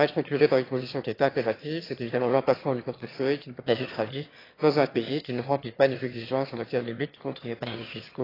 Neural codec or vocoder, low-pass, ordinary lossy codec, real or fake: autoencoder, 22.05 kHz, a latent of 192 numbers a frame, VITS, trained on one speaker; 5.4 kHz; AAC, 24 kbps; fake